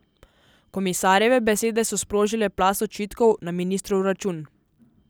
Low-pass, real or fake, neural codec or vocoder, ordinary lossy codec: none; real; none; none